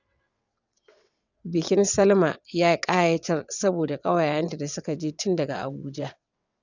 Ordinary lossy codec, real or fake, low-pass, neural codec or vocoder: none; fake; 7.2 kHz; vocoder, 22.05 kHz, 80 mel bands, WaveNeXt